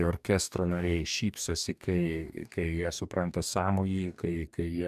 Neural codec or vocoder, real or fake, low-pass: codec, 44.1 kHz, 2.6 kbps, DAC; fake; 14.4 kHz